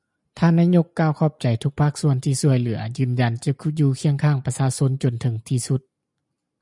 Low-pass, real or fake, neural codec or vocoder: 10.8 kHz; real; none